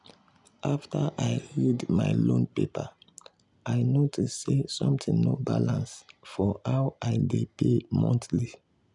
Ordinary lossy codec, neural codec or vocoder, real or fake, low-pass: none; none; real; 10.8 kHz